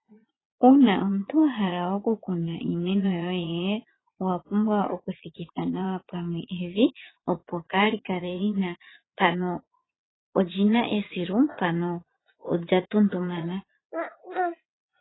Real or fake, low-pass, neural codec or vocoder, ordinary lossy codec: fake; 7.2 kHz; vocoder, 22.05 kHz, 80 mel bands, Vocos; AAC, 16 kbps